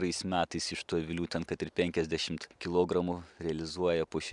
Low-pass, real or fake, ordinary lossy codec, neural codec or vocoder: 10.8 kHz; real; MP3, 96 kbps; none